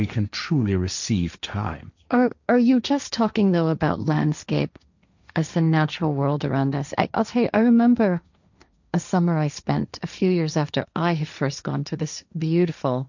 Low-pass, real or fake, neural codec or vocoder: 7.2 kHz; fake; codec, 16 kHz, 1.1 kbps, Voila-Tokenizer